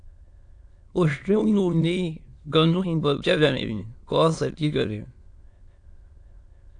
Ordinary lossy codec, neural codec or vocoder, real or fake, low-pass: AAC, 64 kbps; autoencoder, 22.05 kHz, a latent of 192 numbers a frame, VITS, trained on many speakers; fake; 9.9 kHz